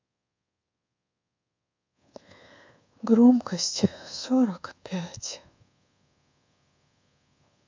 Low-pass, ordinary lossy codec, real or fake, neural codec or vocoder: 7.2 kHz; MP3, 64 kbps; fake; codec, 24 kHz, 1.2 kbps, DualCodec